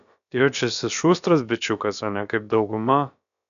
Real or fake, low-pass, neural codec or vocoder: fake; 7.2 kHz; codec, 16 kHz, about 1 kbps, DyCAST, with the encoder's durations